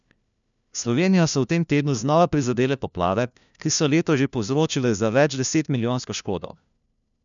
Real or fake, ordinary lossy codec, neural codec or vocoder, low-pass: fake; none; codec, 16 kHz, 1 kbps, FunCodec, trained on LibriTTS, 50 frames a second; 7.2 kHz